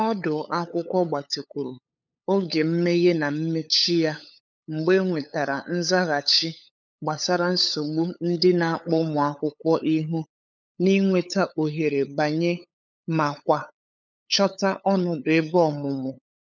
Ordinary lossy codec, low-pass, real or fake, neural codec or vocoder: none; 7.2 kHz; fake; codec, 16 kHz, 8 kbps, FunCodec, trained on LibriTTS, 25 frames a second